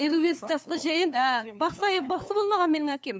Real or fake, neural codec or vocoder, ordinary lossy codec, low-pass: fake; codec, 16 kHz, 2 kbps, FunCodec, trained on LibriTTS, 25 frames a second; none; none